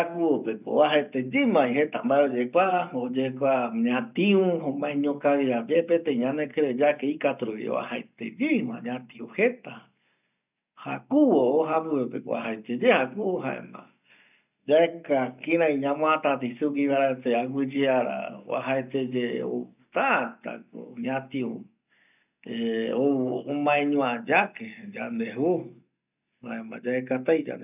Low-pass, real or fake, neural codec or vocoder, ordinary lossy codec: 3.6 kHz; real; none; none